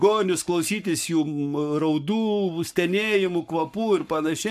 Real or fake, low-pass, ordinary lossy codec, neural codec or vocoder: fake; 14.4 kHz; MP3, 96 kbps; codec, 44.1 kHz, 7.8 kbps, Pupu-Codec